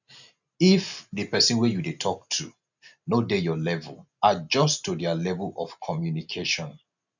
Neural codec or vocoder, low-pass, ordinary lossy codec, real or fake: none; 7.2 kHz; none; real